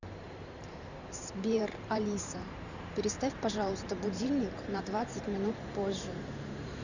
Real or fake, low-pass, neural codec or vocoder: fake; 7.2 kHz; vocoder, 44.1 kHz, 128 mel bands every 512 samples, BigVGAN v2